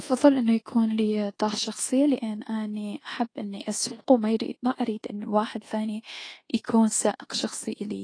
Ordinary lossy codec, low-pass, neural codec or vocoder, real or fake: AAC, 32 kbps; 9.9 kHz; codec, 24 kHz, 1.2 kbps, DualCodec; fake